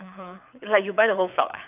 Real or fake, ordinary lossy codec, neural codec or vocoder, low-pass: fake; none; codec, 24 kHz, 6 kbps, HILCodec; 3.6 kHz